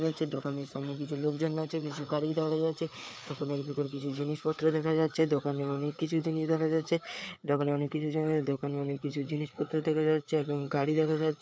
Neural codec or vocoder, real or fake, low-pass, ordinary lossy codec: codec, 16 kHz, 4 kbps, FreqCodec, larger model; fake; none; none